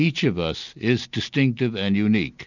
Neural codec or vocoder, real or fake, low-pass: none; real; 7.2 kHz